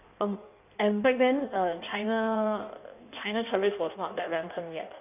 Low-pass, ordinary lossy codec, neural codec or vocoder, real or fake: 3.6 kHz; none; codec, 16 kHz in and 24 kHz out, 1.1 kbps, FireRedTTS-2 codec; fake